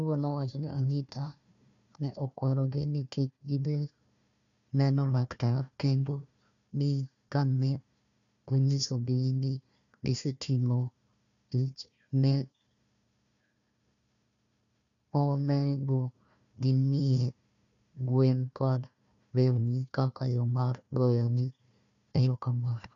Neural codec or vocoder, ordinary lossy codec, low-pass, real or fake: codec, 16 kHz, 1 kbps, FunCodec, trained on Chinese and English, 50 frames a second; none; 7.2 kHz; fake